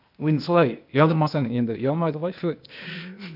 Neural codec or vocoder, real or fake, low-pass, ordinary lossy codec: codec, 16 kHz, 0.8 kbps, ZipCodec; fake; 5.4 kHz; none